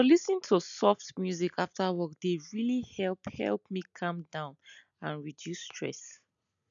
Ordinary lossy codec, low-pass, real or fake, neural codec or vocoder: none; 7.2 kHz; real; none